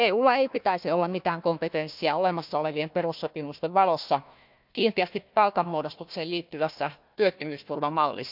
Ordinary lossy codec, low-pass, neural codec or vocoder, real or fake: none; 5.4 kHz; codec, 16 kHz, 1 kbps, FunCodec, trained on Chinese and English, 50 frames a second; fake